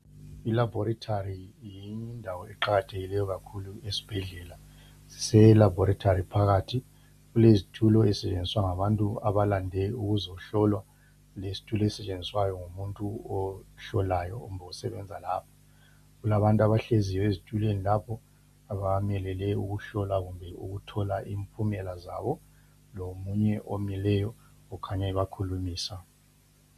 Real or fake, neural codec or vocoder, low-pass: real; none; 14.4 kHz